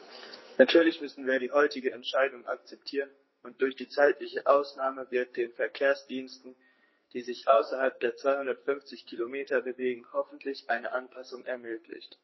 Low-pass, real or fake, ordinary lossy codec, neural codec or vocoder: 7.2 kHz; fake; MP3, 24 kbps; codec, 44.1 kHz, 2.6 kbps, SNAC